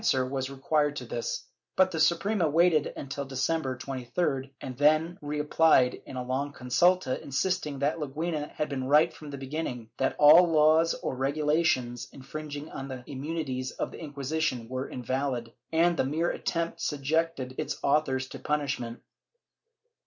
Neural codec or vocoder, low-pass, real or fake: none; 7.2 kHz; real